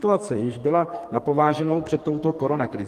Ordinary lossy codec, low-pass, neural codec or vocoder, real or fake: Opus, 24 kbps; 14.4 kHz; codec, 44.1 kHz, 2.6 kbps, SNAC; fake